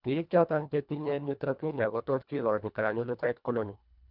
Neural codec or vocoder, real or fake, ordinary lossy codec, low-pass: codec, 24 kHz, 1.5 kbps, HILCodec; fake; none; 5.4 kHz